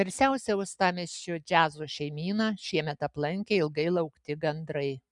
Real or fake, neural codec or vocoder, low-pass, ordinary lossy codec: real; none; 10.8 kHz; MP3, 96 kbps